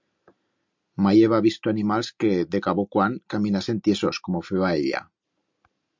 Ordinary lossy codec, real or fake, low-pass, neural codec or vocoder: MP3, 64 kbps; real; 7.2 kHz; none